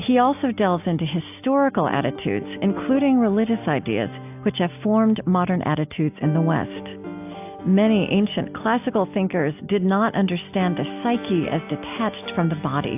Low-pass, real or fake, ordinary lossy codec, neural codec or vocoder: 3.6 kHz; real; AAC, 32 kbps; none